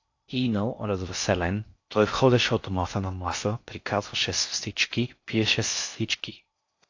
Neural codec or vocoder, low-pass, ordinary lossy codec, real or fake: codec, 16 kHz in and 24 kHz out, 0.6 kbps, FocalCodec, streaming, 4096 codes; 7.2 kHz; AAC, 48 kbps; fake